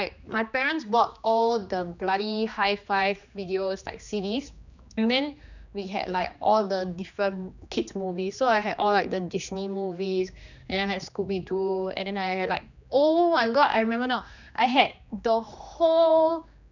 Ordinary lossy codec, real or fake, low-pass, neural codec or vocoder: none; fake; 7.2 kHz; codec, 16 kHz, 2 kbps, X-Codec, HuBERT features, trained on general audio